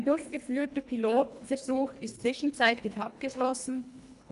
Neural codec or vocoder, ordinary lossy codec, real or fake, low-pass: codec, 24 kHz, 1.5 kbps, HILCodec; none; fake; 10.8 kHz